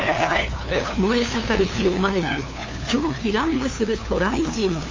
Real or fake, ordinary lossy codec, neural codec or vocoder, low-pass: fake; MP3, 32 kbps; codec, 16 kHz, 2 kbps, FunCodec, trained on LibriTTS, 25 frames a second; 7.2 kHz